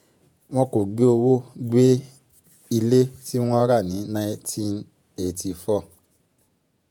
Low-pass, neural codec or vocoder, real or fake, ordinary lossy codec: 19.8 kHz; vocoder, 48 kHz, 128 mel bands, Vocos; fake; none